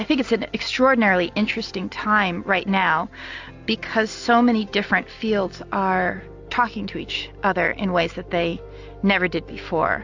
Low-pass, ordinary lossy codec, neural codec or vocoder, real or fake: 7.2 kHz; AAC, 48 kbps; none; real